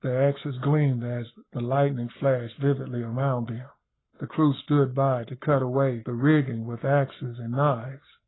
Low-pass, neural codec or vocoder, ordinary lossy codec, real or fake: 7.2 kHz; codec, 44.1 kHz, 7.8 kbps, Pupu-Codec; AAC, 16 kbps; fake